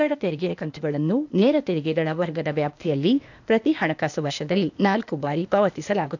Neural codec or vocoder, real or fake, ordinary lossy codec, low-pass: codec, 16 kHz, 0.8 kbps, ZipCodec; fake; none; 7.2 kHz